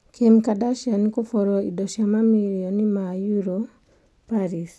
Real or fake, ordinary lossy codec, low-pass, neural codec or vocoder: real; none; none; none